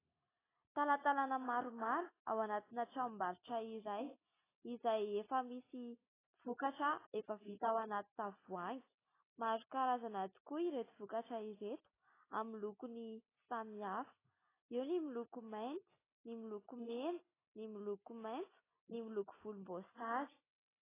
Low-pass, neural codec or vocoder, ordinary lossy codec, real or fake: 3.6 kHz; none; AAC, 16 kbps; real